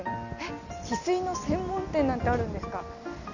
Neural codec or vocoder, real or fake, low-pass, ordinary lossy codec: none; real; 7.2 kHz; none